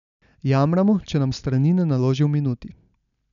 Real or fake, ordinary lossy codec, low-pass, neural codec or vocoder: real; none; 7.2 kHz; none